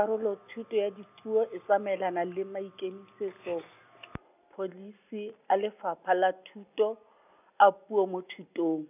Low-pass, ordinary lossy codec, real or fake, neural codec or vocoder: 3.6 kHz; none; real; none